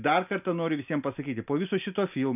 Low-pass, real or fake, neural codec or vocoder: 3.6 kHz; real; none